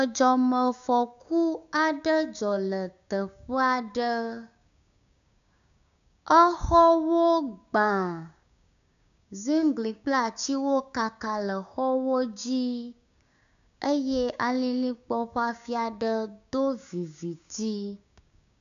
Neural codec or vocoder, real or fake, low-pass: codec, 16 kHz, 6 kbps, DAC; fake; 7.2 kHz